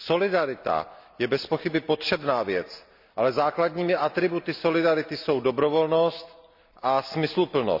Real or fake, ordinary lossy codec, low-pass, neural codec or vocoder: real; none; 5.4 kHz; none